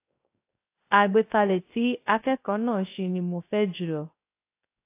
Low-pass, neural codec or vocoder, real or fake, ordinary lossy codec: 3.6 kHz; codec, 16 kHz, 0.3 kbps, FocalCodec; fake; AAC, 24 kbps